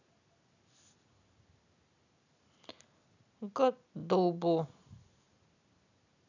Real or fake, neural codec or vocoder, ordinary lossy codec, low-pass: real; none; none; 7.2 kHz